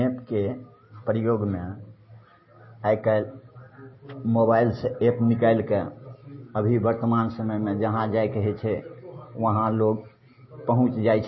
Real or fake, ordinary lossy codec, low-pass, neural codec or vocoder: real; MP3, 24 kbps; 7.2 kHz; none